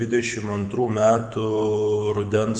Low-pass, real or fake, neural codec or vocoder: 9.9 kHz; fake; codec, 24 kHz, 6 kbps, HILCodec